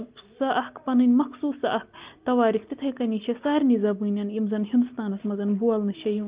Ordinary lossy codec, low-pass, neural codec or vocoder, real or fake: Opus, 32 kbps; 3.6 kHz; none; real